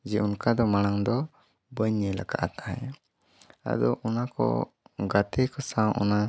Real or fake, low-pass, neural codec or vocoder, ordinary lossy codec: real; none; none; none